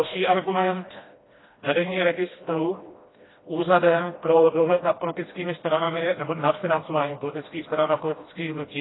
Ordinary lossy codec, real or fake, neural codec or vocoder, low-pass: AAC, 16 kbps; fake; codec, 16 kHz, 1 kbps, FreqCodec, smaller model; 7.2 kHz